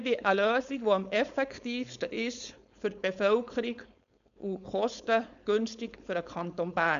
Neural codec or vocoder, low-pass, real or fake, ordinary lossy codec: codec, 16 kHz, 4.8 kbps, FACodec; 7.2 kHz; fake; none